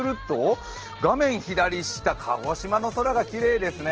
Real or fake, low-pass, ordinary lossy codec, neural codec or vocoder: real; 7.2 kHz; Opus, 16 kbps; none